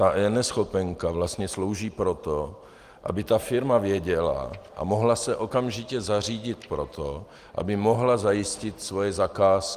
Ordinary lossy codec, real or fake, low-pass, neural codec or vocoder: Opus, 24 kbps; real; 14.4 kHz; none